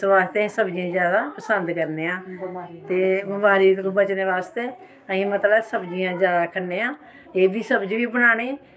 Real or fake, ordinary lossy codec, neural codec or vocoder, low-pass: fake; none; codec, 16 kHz, 6 kbps, DAC; none